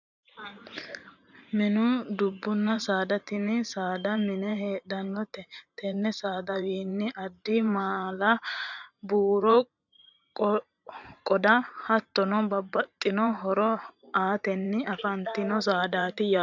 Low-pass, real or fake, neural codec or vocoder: 7.2 kHz; fake; vocoder, 44.1 kHz, 128 mel bands every 512 samples, BigVGAN v2